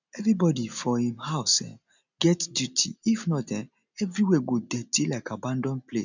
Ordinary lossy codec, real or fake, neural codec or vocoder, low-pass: none; real; none; 7.2 kHz